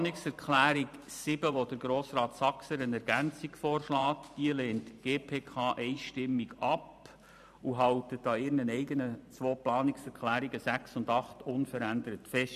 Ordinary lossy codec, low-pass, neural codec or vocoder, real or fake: none; 14.4 kHz; vocoder, 44.1 kHz, 128 mel bands every 512 samples, BigVGAN v2; fake